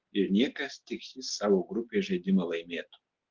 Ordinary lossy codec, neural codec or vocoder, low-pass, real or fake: Opus, 16 kbps; none; 7.2 kHz; real